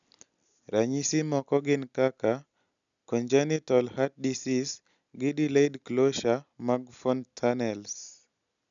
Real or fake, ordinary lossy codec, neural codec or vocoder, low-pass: real; none; none; 7.2 kHz